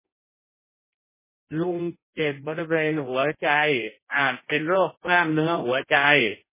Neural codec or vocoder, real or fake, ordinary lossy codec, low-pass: codec, 16 kHz in and 24 kHz out, 0.6 kbps, FireRedTTS-2 codec; fake; MP3, 16 kbps; 3.6 kHz